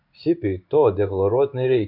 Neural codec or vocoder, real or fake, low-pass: codec, 16 kHz in and 24 kHz out, 1 kbps, XY-Tokenizer; fake; 5.4 kHz